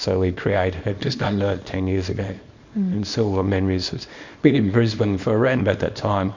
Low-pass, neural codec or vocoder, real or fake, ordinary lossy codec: 7.2 kHz; codec, 24 kHz, 0.9 kbps, WavTokenizer, small release; fake; MP3, 48 kbps